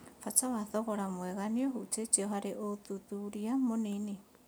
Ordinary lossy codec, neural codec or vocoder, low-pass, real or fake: none; none; none; real